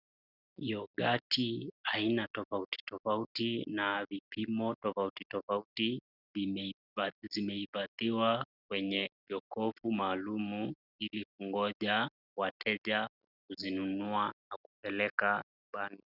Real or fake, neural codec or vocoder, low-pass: real; none; 5.4 kHz